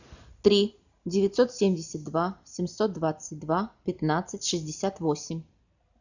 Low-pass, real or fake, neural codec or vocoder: 7.2 kHz; real; none